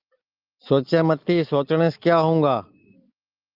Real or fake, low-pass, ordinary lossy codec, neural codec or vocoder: real; 5.4 kHz; Opus, 24 kbps; none